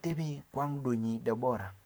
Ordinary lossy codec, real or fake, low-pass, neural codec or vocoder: none; fake; none; codec, 44.1 kHz, 7.8 kbps, Pupu-Codec